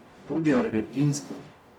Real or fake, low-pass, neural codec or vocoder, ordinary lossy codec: fake; 19.8 kHz; codec, 44.1 kHz, 0.9 kbps, DAC; none